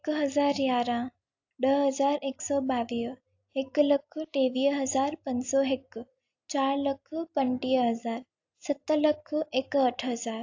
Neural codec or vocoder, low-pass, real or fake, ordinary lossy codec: none; 7.2 kHz; real; AAC, 48 kbps